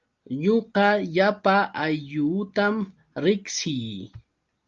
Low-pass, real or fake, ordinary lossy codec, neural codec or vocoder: 7.2 kHz; real; Opus, 32 kbps; none